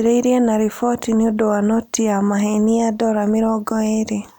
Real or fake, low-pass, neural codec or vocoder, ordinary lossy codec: real; none; none; none